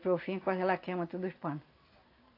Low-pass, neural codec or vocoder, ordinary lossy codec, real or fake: 5.4 kHz; none; AAC, 32 kbps; real